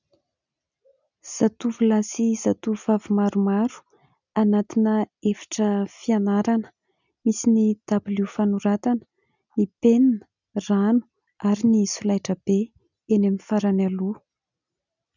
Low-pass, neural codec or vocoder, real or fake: 7.2 kHz; none; real